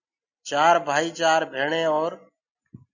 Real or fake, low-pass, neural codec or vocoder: real; 7.2 kHz; none